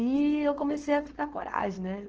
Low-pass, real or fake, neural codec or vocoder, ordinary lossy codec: 7.2 kHz; fake; codec, 16 kHz, 4.8 kbps, FACodec; Opus, 16 kbps